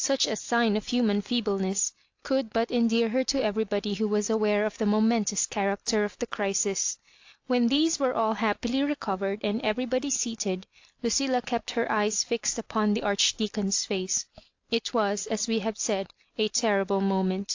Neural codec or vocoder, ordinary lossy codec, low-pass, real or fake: none; AAC, 48 kbps; 7.2 kHz; real